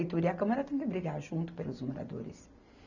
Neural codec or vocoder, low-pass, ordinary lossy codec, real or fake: none; 7.2 kHz; none; real